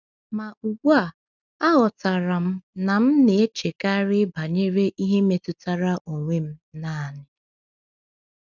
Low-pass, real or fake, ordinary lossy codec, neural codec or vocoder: 7.2 kHz; real; none; none